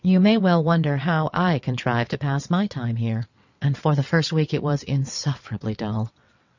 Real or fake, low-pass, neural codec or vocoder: fake; 7.2 kHz; vocoder, 22.05 kHz, 80 mel bands, WaveNeXt